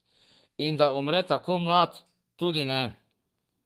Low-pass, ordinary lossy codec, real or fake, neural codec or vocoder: 14.4 kHz; Opus, 32 kbps; fake; codec, 32 kHz, 1.9 kbps, SNAC